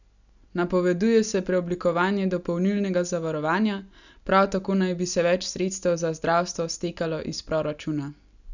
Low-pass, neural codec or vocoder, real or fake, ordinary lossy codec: 7.2 kHz; none; real; none